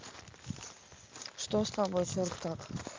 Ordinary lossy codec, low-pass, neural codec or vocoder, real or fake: Opus, 32 kbps; 7.2 kHz; none; real